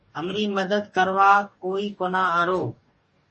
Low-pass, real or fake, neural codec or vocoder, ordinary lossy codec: 10.8 kHz; fake; codec, 44.1 kHz, 2.6 kbps, DAC; MP3, 32 kbps